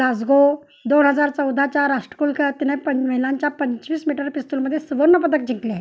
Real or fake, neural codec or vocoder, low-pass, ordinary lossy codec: real; none; none; none